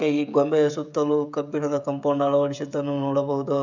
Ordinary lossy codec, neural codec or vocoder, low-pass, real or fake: none; codec, 16 kHz, 16 kbps, FreqCodec, smaller model; 7.2 kHz; fake